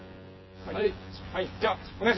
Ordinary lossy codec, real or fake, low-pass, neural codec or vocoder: MP3, 24 kbps; fake; 7.2 kHz; vocoder, 24 kHz, 100 mel bands, Vocos